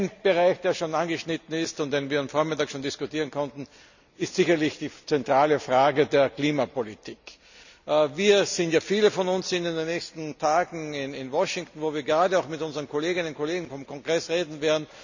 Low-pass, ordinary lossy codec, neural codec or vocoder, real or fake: 7.2 kHz; none; none; real